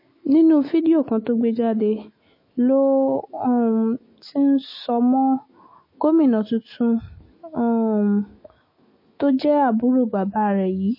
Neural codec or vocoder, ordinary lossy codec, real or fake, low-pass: autoencoder, 48 kHz, 128 numbers a frame, DAC-VAE, trained on Japanese speech; MP3, 24 kbps; fake; 5.4 kHz